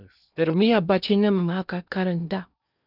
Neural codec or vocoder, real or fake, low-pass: codec, 16 kHz in and 24 kHz out, 0.8 kbps, FocalCodec, streaming, 65536 codes; fake; 5.4 kHz